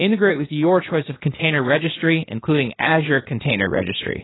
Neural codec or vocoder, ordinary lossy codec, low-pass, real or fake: codec, 16 kHz, 0.8 kbps, ZipCodec; AAC, 16 kbps; 7.2 kHz; fake